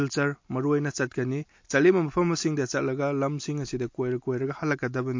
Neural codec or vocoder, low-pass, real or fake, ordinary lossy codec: none; 7.2 kHz; real; MP3, 48 kbps